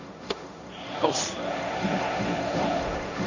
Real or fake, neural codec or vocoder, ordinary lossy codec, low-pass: fake; codec, 16 kHz, 1.1 kbps, Voila-Tokenizer; Opus, 64 kbps; 7.2 kHz